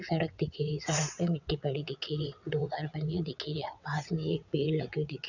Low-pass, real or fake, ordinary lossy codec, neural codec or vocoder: 7.2 kHz; fake; none; vocoder, 22.05 kHz, 80 mel bands, WaveNeXt